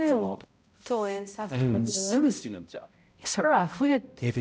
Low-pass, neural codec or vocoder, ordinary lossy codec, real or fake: none; codec, 16 kHz, 0.5 kbps, X-Codec, HuBERT features, trained on balanced general audio; none; fake